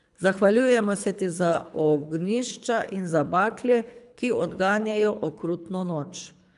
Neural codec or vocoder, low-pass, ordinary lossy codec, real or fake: codec, 24 kHz, 3 kbps, HILCodec; 10.8 kHz; none; fake